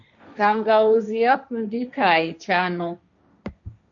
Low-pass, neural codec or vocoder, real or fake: 7.2 kHz; codec, 16 kHz, 1.1 kbps, Voila-Tokenizer; fake